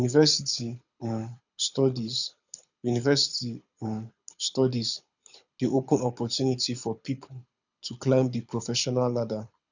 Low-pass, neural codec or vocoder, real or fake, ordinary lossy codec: 7.2 kHz; codec, 24 kHz, 6 kbps, HILCodec; fake; none